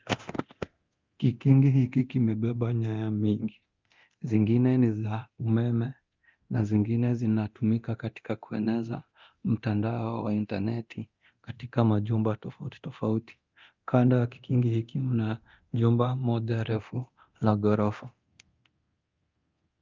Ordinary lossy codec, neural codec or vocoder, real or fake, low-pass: Opus, 24 kbps; codec, 24 kHz, 0.9 kbps, DualCodec; fake; 7.2 kHz